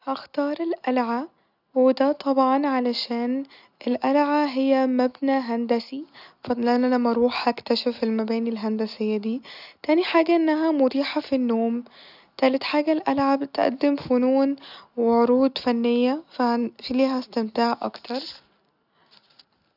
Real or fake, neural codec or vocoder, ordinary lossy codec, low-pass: real; none; none; 5.4 kHz